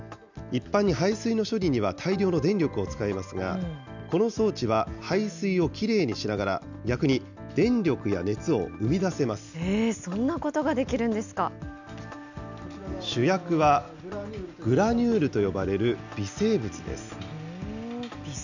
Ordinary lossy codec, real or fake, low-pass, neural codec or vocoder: none; real; 7.2 kHz; none